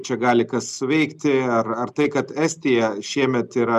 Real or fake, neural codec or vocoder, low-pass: real; none; 14.4 kHz